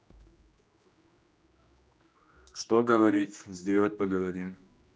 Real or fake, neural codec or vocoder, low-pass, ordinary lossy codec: fake; codec, 16 kHz, 1 kbps, X-Codec, HuBERT features, trained on general audio; none; none